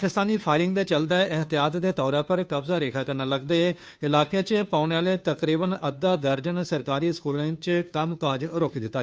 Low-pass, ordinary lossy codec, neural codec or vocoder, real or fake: none; none; codec, 16 kHz, 2 kbps, FunCodec, trained on Chinese and English, 25 frames a second; fake